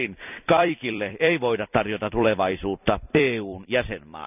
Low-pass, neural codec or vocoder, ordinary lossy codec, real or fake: 3.6 kHz; vocoder, 44.1 kHz, 128 mel bands every 256 samples, BigVGAN v2; none; fake